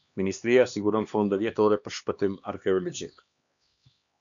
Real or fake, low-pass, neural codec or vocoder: fake; 7.2 kHz; codec, 16 kHz, 2 kbps, X-Codec, HuBERT features, trained on LibriSpeech